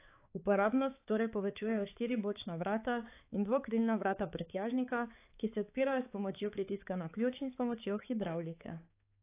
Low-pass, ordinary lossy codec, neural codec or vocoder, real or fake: 3.6 kHz; AAC, 24 kbps; codec, 16 kHz, 4 kbps, X-Codec, HuBERT features, trained on balanced general audio; fake